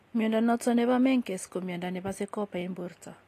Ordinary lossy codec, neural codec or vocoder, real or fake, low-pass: AAC, 48 kbps; none; real; 14.4 kHz